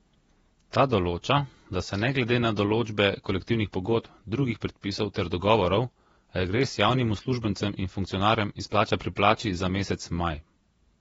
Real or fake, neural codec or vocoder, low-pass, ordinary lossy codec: fake; vocoder, 48 kHz, 128 mel bands, Vocos; 19.8 kHz; AAC, 24 kbps